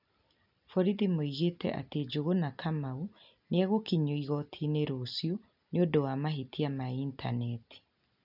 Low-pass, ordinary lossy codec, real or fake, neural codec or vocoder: 5.4 kHz; none; real; none